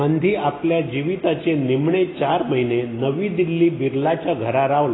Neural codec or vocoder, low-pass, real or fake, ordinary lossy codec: none; 7.2 kHz; real; AAC, 16 kbps